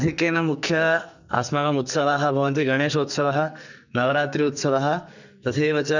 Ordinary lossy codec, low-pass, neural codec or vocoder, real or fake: none; 7.2 kHz; codec, 44.1 kHz, 2.6 kbps, SNAC; fake